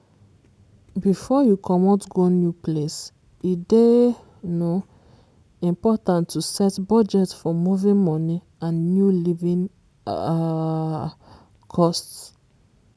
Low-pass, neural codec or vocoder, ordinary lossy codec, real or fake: none; none; none; real